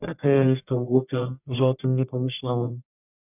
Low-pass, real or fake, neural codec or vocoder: 3.6 kHz; fake; codec, 44.1 kHz, 1.7 kbps, Pupu-Codec